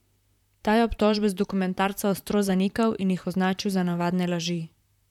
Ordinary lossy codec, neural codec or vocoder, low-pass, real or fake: none; vocoder, 44.1 kHz, 128 mel bands, Pupu-Vocoder; 19.8 kHz; fake